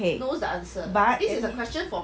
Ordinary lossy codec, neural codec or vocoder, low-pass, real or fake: none; none; none; real